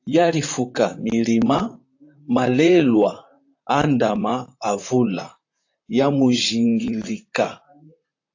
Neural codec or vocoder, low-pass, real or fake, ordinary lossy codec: vocoder, 44.1 kHz, 128 mel bands, Pupu-Vocoder; 7.2 kHz; fake; AAC, 48 kbps